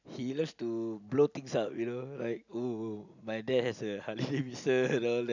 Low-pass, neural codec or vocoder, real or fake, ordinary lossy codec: 7.2 kHz; none; real; none